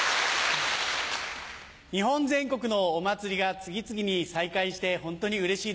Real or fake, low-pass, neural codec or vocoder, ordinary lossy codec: real; none; none; none